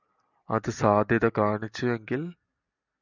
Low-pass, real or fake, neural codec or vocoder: 7.2 kHz; real; none